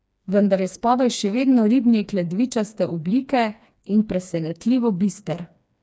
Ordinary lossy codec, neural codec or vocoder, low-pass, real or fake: none; codec, 16 kHz, 2 kbps, FreqCodec, smaller model; none; fake